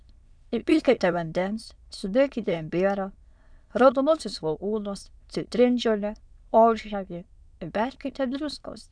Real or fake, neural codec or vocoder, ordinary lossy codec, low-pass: fake; autoencoder, 22.05 kHz, a latent of 192 numbers a frame, VITS, trained on many speakers; Opus, 64 kbps; 9.9 kHz